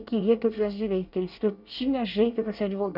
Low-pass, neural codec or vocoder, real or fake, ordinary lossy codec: 5.4 kHz; codec, 24 kHz, 1 kbps, SNAC; fake; none